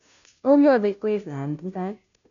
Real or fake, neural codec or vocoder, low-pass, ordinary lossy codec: fake; codec, 16 kHz, 0.5 kbps, FunCodec, trained on Chinese and English, 25 frames a second; 7.2 kHz; none